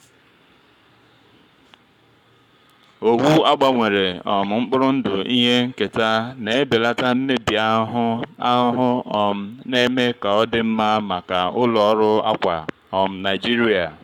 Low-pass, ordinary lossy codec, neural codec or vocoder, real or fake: 19.8 kHz; none; codec, 44.1 kHz, 7.8 kbps, Pupu-Codec; fake